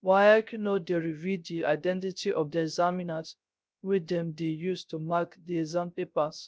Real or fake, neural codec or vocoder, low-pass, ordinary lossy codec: fake; codec, 16 kHz, 0.3 kbps, FocalCodec; none; none